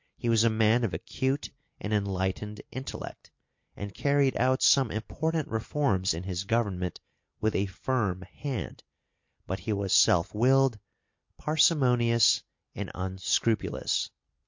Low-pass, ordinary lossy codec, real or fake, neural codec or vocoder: 7.2 kHz; MP3, 48 kbps; real; none